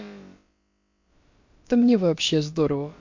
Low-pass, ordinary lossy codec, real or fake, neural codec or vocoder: 7.2 kHz; MP3, 48 kbps; fake; codec, 16 kHz, about 1 kbps, DyCAST, with the encoder's durations